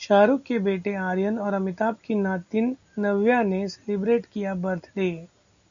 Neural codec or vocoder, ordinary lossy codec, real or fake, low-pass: none; MP3, 96 kbps; real; 7.2 kHz